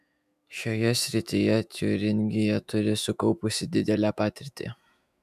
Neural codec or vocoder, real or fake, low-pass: autoencoder, 48 kHz, 128 numbers a frame, DAC-VAE, trained on Japanese speech; fake; 14.4 kHz